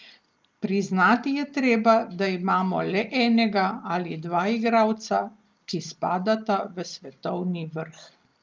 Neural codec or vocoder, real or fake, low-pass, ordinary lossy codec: none; real; 7.2 kHz; Opus, 24 kbps